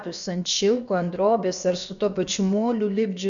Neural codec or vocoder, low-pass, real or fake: codec, 16 kHz, about 1 kbps, DyCAST, with the encoder's durations; 7.2 kHz; fake